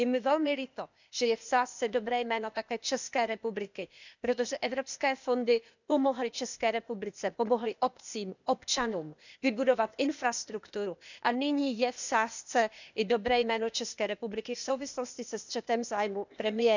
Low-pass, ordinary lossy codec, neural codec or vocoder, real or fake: 7.2 kHz; none; codec, 16 kHz, 0.8 kbps, ZipCodec; fake